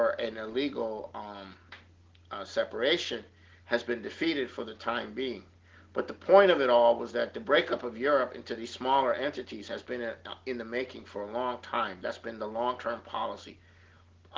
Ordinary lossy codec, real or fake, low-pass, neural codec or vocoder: Opus, 16 kbps; real; 7.2 kHz; none